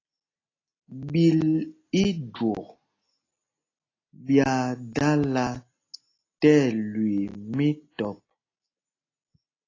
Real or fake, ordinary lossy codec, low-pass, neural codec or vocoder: real; AAC, 32 kbps; 7.2 kHz; none